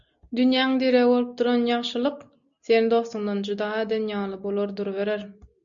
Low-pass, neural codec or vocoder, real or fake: 7.2 kHz; none; real